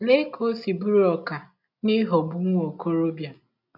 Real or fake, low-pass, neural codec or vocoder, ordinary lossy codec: real; 5.4 kHz; none; none